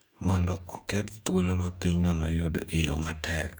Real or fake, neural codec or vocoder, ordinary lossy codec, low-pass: fake; codec, 44.1 kHz, 2.6 kbps, DAC; none; none